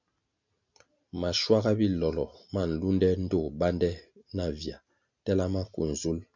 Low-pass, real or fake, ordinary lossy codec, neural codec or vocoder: 7.2 kHz; real; MP3, 64 kbps; none